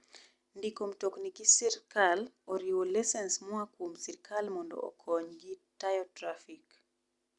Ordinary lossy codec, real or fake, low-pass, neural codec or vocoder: Opus, 64 kbps; real; 10.8 kHz; none